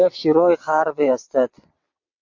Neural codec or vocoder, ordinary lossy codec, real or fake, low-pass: vocoder, 22.05 kHz, 80 mel bands, WaveNeXt; MP3, 48 kbps; fake; 7.2 kHz